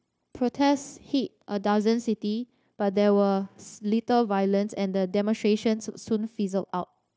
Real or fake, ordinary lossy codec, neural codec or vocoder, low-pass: fake; none; codec, 16 kHz, 0.9 kbps, LongCat-Audio-Codec; none